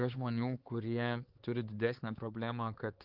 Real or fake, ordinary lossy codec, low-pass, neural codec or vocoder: fake; Opus, 16 kbps; 5.4 kHz; codec, 16 kHz, 4 kbps, X-Codec, HuBERT features, trained on LibriSpeech